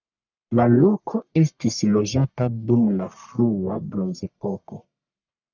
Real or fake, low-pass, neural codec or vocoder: fake; 7.2 kHz; codec, 44.1 kHz, 1.7 kbps, Pupu-Codec